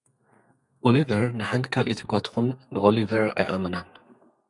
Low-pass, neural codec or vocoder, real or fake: 10.8 kHz; codec, 32 kHz, 1.9 kbps, SNAC; fake